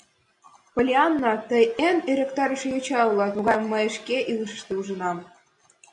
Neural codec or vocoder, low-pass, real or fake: none; 10.8 kHz; real